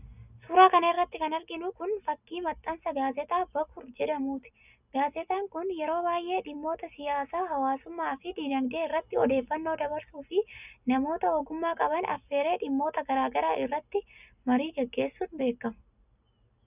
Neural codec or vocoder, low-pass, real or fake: none; 3.6 kHz; real